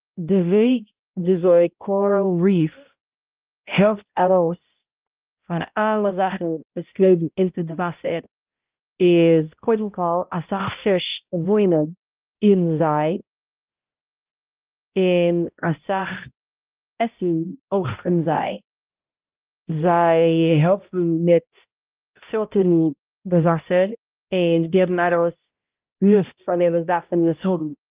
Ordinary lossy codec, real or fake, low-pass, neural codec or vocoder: Opus, 32 kbps; fake; 3.6 kHz; codec, 16 kHz, 0.5 kbps, X-Codec, HuBERT features, trained on balanced general audio